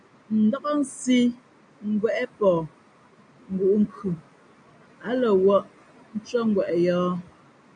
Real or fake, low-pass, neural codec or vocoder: real; 9.9 kHz; none